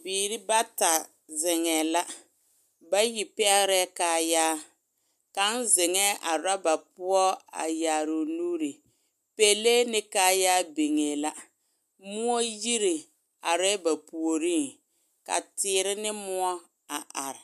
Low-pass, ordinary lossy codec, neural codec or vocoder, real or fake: 14.4 kHz; MP3, 96 kbps; none; real